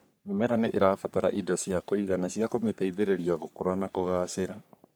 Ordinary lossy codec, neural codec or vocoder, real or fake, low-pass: none; codec, 44.1 kHz, 3.4 kbps, Pupu-Codec; fake; none